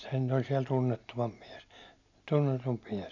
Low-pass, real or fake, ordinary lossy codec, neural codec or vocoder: 7.2 kHz; real; MP3, 64 kbps; none